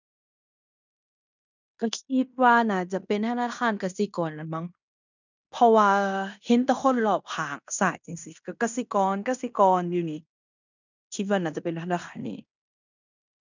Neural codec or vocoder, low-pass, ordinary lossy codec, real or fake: codec, 16 kHz in and 24 kHz out, 0.9 kbps, LongCat-Audio-Codec, fine tuned four codebook decoder; 7.2 kHz; none; fake